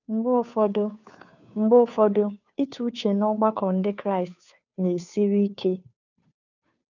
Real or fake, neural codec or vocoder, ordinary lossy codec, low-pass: fake; codec, 16 kHz, 2 kbps, FunCodec, trained on Chinese and English, 25 frames a second; none; 7.2 kHz